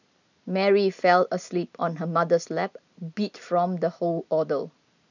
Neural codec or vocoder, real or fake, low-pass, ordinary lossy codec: none; real; 7.2 kHz; none